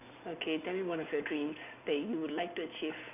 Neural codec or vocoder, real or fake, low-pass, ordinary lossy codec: none; real; 3.6 kHz; none